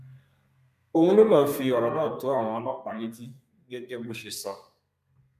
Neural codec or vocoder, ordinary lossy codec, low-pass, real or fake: codec, 32 kHz, 1.9 kbps, SNAC; MP3, 96 kbps; 14.4 kHz; fake